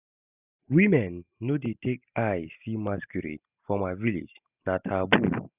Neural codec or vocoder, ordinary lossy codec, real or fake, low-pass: none; none; real; 3.6 kHz